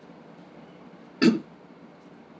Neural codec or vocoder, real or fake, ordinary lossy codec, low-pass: none; real; none; none